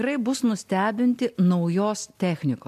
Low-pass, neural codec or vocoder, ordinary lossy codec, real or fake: 14.4 kHz; none; MP3, 96 kbps; real